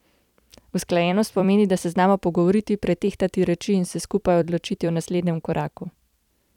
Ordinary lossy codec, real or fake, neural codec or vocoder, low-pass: none; fake; vocoder, 44.1 kHz, 128 mel bands every 256 samples, BigVGAN v2; 19.8 kHz